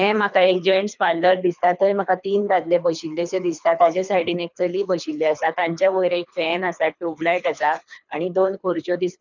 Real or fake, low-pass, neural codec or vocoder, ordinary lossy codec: fake; 7.2 kHz; codec, 24 kHz, 3 kbps, HILCodec; none